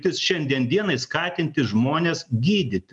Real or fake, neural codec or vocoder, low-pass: real; none; 10.8 kHz